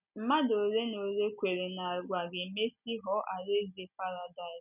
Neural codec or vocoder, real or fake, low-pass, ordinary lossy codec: none; real; 3.6 kHz; none